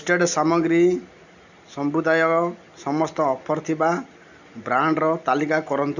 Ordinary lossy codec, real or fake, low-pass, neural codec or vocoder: none; real; 7.2 kHz; none